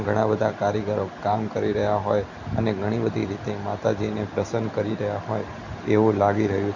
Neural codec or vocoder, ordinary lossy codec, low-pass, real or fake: none; none; 7.2 kHz; real